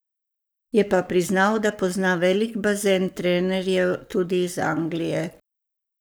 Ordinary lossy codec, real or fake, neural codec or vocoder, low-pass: none; fake; codec, 44.1 kHz, 7.8 kbps, Pupu-Codec; none